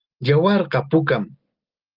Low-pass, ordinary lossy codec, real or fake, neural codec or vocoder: 5.4 kHz; Opus, 32 kbps; real; none